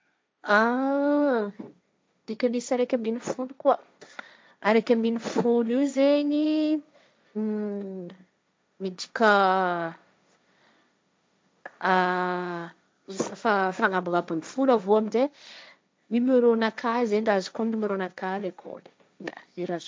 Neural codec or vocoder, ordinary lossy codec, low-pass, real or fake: codec, 16 kHz, 1.1 kbps, Voila-Tokenizer; none; none; fake